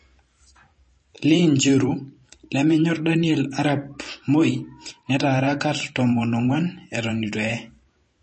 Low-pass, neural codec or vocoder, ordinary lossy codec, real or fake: 10.8 kHz; vocoder, 44.1 kHz, 128 mel bands every 512 samples, BigVGAN v2; MP3, 32 kbps; fake